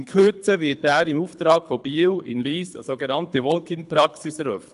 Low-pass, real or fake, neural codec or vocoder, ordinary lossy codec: 10.8 kHz; fake; codec, 24 kHz, 3 kbps, HILCodec; AAC, 96 kbps